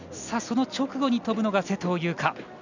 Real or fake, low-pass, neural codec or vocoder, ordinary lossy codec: real; 7.2 kHz; none; none